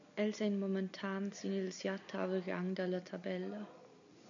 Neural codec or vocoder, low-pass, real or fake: none; 7.2 kHz; real